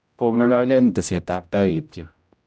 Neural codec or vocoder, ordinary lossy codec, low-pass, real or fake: codec, 16 kHz, 0.5 kbps, X-Codec, HuBERT features, trained on general audio; none; none; fake